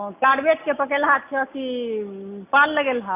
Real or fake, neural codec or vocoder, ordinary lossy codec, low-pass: real; none; AAC, 24 kbps; 3.6 kHz